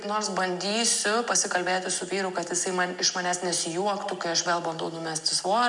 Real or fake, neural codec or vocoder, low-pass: real; none; 10.8 kHz